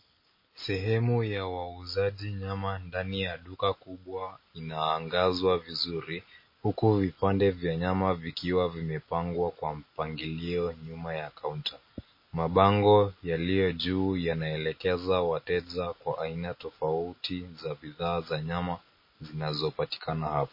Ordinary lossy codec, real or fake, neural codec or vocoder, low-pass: MP3, 24 kbps; real; none; 5.4 kHz